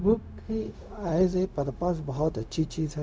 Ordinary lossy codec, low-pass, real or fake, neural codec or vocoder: none; none; fake; codec, 16 kHz, 0.4 kbps, LongCat-Audio-Codec